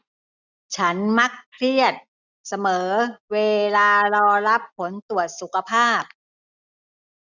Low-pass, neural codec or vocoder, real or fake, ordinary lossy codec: 7.2 kHz; none; real; none